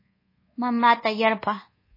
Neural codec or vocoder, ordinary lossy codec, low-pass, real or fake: codec, 24 kHz, 1.2 kbps, DualCodec; MP3, 24 kbps; 5.4 kHz; fake